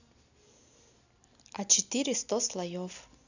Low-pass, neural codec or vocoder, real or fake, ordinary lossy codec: 7.2 kHz; none; real; none